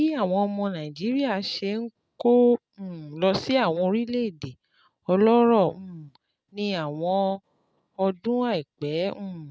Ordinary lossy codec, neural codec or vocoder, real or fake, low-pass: none; none; real; none